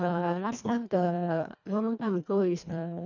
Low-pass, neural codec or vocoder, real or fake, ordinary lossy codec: 7.2 kHz; codec, 24 kHz, 1.5 kbps, HILCodec; fake; none